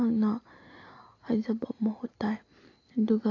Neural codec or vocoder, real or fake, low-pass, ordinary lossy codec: none; real; 7.2 kHz; AAC, 32 kbps